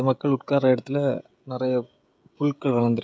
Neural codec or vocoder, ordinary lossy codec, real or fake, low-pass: codec, 16 kHz, 16 kbps, FreqCodec, smaller model; none; fake; none